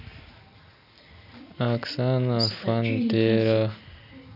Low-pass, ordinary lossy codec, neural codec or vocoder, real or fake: 5.4 kHz; none; none; real